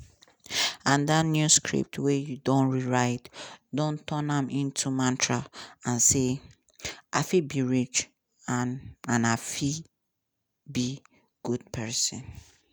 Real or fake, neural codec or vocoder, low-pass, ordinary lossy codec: real; none; none; none